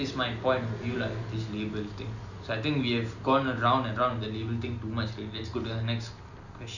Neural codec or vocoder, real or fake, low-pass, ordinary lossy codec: none; real; 7.2 kHz; none